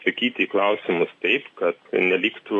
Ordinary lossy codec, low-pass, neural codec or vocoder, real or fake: AAC, 48 kbps; 10.8 kHz; none; real